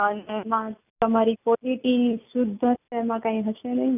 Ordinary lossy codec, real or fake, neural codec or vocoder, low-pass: none; real; none; 3.6 kHz